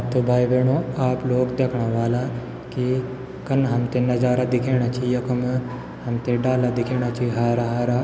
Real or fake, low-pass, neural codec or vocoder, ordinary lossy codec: real; none; none; none